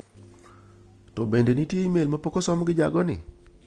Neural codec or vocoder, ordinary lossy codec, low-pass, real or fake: none; Opus, 24 kbps; 9.9 kHz; real